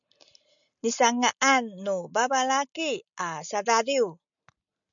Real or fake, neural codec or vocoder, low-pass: real; none; 7.2 kHz